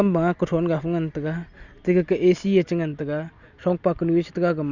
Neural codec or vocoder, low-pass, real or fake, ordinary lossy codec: none; 7.2 kHz; real; Opus, 64 kbps